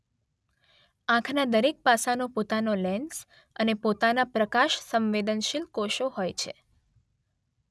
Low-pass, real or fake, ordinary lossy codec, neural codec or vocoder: none; real; none; none